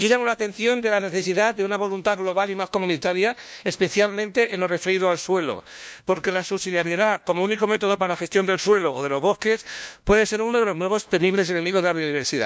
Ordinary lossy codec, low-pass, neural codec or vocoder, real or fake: none; none; codec, 16 kHz, 1 kbps, FunCodec, trained on LibriTTS, 50 frames a second; fake